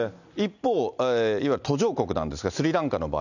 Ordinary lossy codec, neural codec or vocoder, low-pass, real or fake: none; none; 7.2 kHz; real